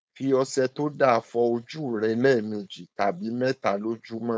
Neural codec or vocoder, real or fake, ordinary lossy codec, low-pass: codec, 16 kHz, 4.8 kbps, FACodec; fake; none; none